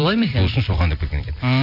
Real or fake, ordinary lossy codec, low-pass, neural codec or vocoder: fake; none; 5.4 kHz; vocoder, 44.1 kHz, 128 mel bands every 256 samples, BigVGAN v2